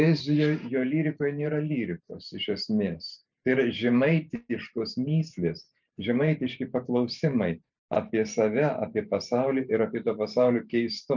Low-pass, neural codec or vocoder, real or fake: 7.2 kHz; vocoder, 44.1 kHz, 128 mel bands every 512 samples, BigVGAN v2; fake